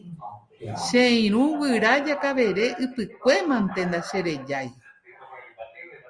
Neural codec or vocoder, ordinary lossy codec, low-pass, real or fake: none; Opus, 32 kbps; 9.9 kHz; real